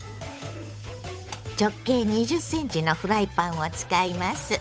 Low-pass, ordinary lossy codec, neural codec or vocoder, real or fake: none; none; codec, 16 kHz, 8 kbps, FunCodec, trained on Chinese and English, 25 frames a second; fake